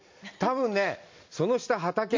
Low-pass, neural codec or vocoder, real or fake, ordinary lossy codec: 7.2 kHz; none; real; MP3, 48 kbps